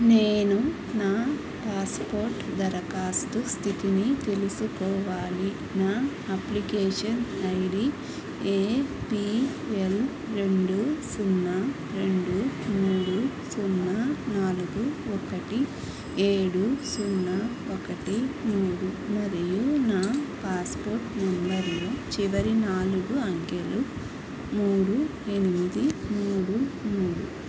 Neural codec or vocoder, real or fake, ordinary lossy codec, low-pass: none; real; none; none